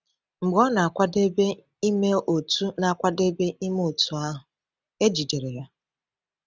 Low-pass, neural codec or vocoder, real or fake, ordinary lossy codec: none; none; real; none